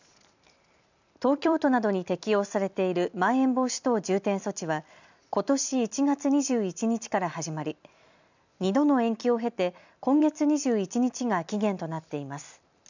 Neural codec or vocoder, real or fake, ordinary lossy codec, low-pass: vocoder, 44.1 kHz, 128 mel bands every 256 samples, BigVGAN v2; fake; none; 7.2 kHz